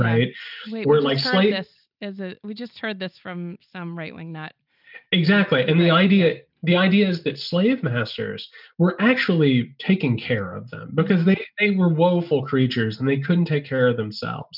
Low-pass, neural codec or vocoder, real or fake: 5.4 kHz; none; real